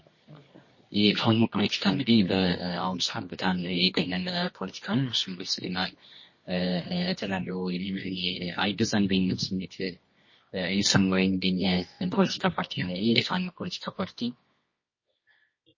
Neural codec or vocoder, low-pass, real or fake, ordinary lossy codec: codec, 24 kHz, 0.9 kbps, WavTokenizer, medium music audio release; 7.2 kHz; fake; MP3, 32 kbps